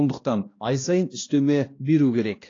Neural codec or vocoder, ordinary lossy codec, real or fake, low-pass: codec, 16 kHz, 1 kbps, X-Codec, HuBERT features, trained on balanced general audio; AAC, 32 kbps; fake; 7.2 kHz